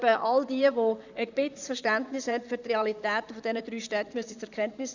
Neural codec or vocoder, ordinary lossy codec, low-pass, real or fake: vocoder, 44.1 kHz, 128 mel bands, Pupu-Vocoder; none; 7.2 kHz; fake